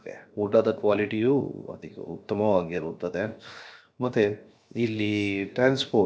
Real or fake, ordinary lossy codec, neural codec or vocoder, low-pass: fake; none; codec, 16 kHz, 0.7 kbps, FocalCodec; none